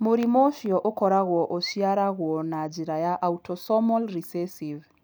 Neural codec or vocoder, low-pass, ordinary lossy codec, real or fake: none; none; none; real